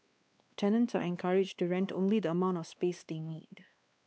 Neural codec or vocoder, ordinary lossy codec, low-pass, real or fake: codec, 16 kHz, 2 kbps, X-Codec, WavLM features, trained on Multilingual LibriSpeech; none; none; fake